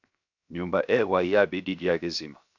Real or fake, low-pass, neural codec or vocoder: fake; 7.2 kHz; codec, 16 kHz, 0.7 kbps, FocalCodec